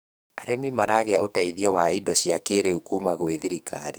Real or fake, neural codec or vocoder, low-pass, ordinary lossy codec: fake; codec, 44.1 kHz, 2.6 kbps, SNAC; none; none